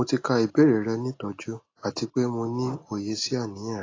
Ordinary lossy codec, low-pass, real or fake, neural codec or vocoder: AAC, 32 kbps; 7.2 kHz; real; none